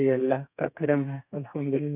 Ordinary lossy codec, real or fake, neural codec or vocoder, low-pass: none; fake; codec, 32 kHz, 1.9 kbps, SNAC; 3.6 kHz